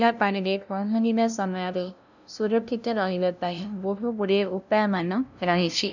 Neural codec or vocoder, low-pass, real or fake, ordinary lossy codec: codec, 16 kHz, 0.5 kbps, FunCodec, trained on LibriTTS, 25 frames a second; 7.2 kHz; fake; none